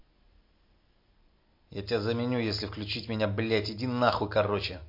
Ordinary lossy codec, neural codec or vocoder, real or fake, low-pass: MP3, 32 kbps; none; real; 5.4 kHz